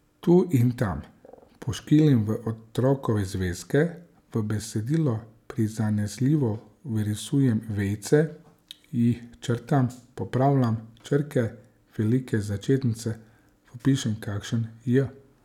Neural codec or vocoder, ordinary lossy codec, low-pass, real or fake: none; none; 19.8 kHz; real